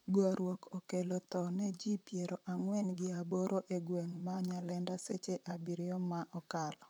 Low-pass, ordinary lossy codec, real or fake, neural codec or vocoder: none; none; fake; vocoder, 44.1 kHz, 128 mel bands, Pupu-Vocoder